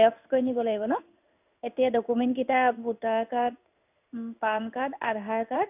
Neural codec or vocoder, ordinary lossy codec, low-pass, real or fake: codec, 16 kHz in and 24 kHz out, 1 kbps, XY-Tokenizer; none; 3.6 kHz; fake